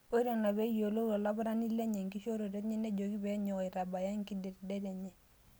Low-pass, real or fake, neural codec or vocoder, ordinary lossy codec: none; real; none; none